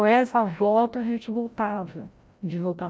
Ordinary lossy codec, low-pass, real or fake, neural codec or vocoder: none; none; fake; codec, 16 kHz, 0.5 kbps, FreqCodec, larger model